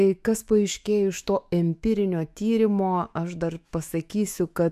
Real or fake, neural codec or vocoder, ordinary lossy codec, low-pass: fake; autoencoder, 48 kHz, 128 numbers a frame, DAC-VAE, trained on Japanese speech; AAC, 96 kbps; 14.4 kHz